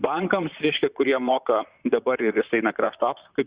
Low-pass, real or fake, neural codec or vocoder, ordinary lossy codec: 3.6 kHz; fake; vocoder, 44.1 kHz, 128 mel bands, Pupu-Vocoder; Opus, 64 kbps